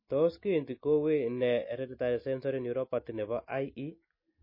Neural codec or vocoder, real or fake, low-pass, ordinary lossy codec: none; real; 5.4 kHz; MP3, 24 kbps